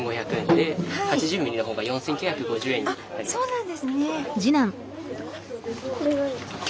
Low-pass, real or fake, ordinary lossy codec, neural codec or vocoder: none; real; none; none